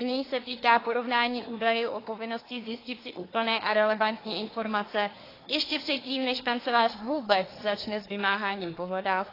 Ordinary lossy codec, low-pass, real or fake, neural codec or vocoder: AAC, 24 kbps; 5.4 kHz; fake; codec, 24 kHz, 1 kbps, SNAC